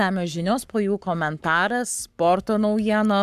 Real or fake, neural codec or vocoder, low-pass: fake; codec, 44.1 kHz, 7.8 kbps, Pupu-Codec; 14.4 kHz